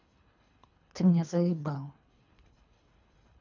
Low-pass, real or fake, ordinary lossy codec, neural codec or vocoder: 7.2 kHz; fake; none; codec, 24 kHz, 3 kbps, HILCodec